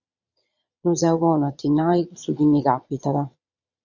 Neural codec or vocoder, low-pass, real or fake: vocoder, 22.05 kHz, 80 mel bands, Vocos; 7.2 kHz; fake